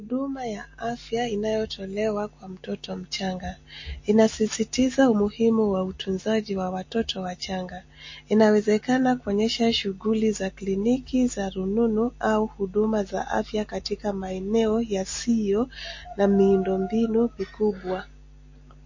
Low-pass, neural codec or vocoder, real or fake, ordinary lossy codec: 7.2 kHz; none; real; MP3, 32 kbps